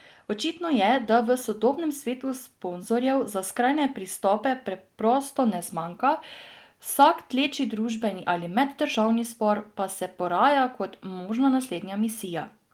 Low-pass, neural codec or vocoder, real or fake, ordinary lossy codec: 19.8 kHz; none; real; Opus, 24 kbps